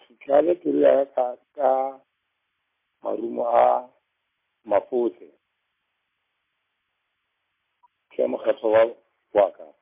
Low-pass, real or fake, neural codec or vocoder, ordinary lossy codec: 3.6 kHz; real; none; MP3, 24 kbps